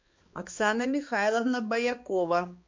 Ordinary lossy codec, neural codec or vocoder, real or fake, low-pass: MP3, 48 kbps; codec, 16 kHz, 2 kbps, X-Codec, HuBERT features, trained on balanced general audio; fake; 7.2 kHz